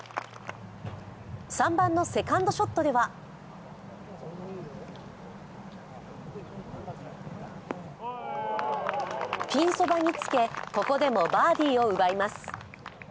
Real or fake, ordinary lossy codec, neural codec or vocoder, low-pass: real; none; none; none